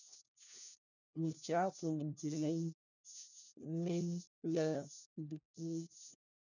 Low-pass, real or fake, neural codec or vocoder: 7.2 kHz; fake; codec, 16 kHz, 1 kbps, FunCodec, trained on LibriTTS, 50 frames a second